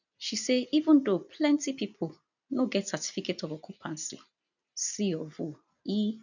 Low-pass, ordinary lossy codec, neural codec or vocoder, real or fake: 7.2 kHz; none; none; real